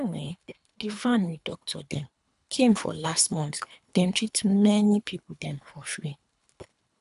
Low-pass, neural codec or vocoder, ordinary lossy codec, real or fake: 10.8 kHz; codec, 24 kHz, 3 kbps, HILCodec; none; fake